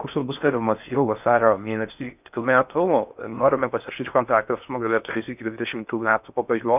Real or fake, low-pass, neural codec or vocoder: fake; 3.6 kHz; codec, 16 kHz in and 24 kHz out, 0.6 kbps, FocalCodec, streaming, 4096 codes